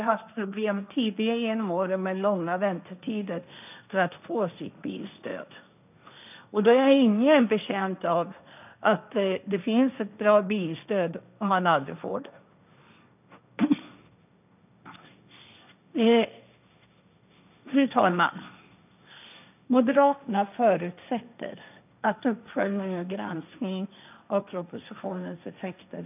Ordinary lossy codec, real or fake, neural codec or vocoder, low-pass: none; fake; codec, 16 kHz, 1.1 kbps, Voila-Tokenizer; 3.6 kHz